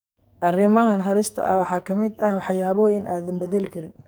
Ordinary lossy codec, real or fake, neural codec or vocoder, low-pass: none; fake; codec, 44.1 kHz, 2.6 kbps, SNAC; none